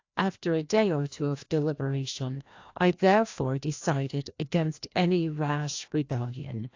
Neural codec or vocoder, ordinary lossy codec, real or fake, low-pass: codec, 16 kHz, 1 kbps, FreqCodec, larger model; AAC, 48 kbps; fake; 7.2 kHz